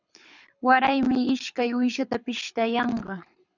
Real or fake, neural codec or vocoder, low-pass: fake; codec, 24 kHz, 6 kbps, HILCodec; 7.2 kHz